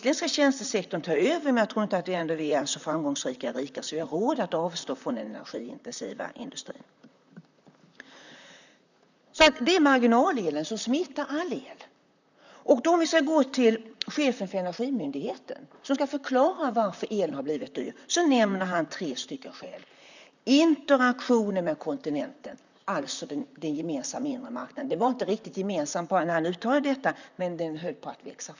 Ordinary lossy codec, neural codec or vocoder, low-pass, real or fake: none; vocoder, 44.1 kHz, 128 mel bands, Pupu-Vocoder; 7.2 kHz; fake